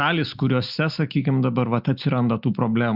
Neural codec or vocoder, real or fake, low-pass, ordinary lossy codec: none; real; 5.4 kHz; Opus, 64 kbps